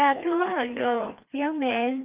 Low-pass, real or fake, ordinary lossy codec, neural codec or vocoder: 3.6 kHz; fake; Opus, 24 kbps; codec, 16 kHz, 2 kbps, FreqCodec, larger model